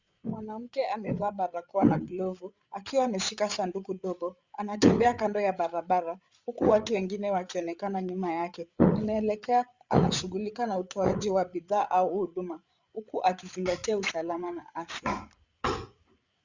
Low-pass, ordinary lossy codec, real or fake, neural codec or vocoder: 7.2 kHz; Opus, 64 kbps; fake; codec, 16 kHz, 8 kbps, FreqCodec, larger model